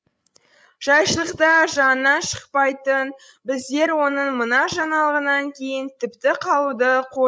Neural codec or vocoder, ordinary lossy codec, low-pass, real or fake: codec, 16 kHz, 16 kbps, FreqCodec, larger model; none; none; fake